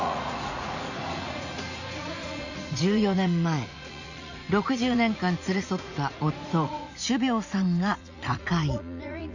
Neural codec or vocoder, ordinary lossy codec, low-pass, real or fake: vocoder, 44.1 kHz, 80 mel bands, Vocos; none; 7.2 kHz; fake